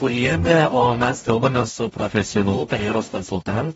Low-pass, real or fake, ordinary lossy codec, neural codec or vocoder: 19.8 kHz; fake; AAC, 24 kbps; codec, 44.1 kHz, 0.9 kbps, DAC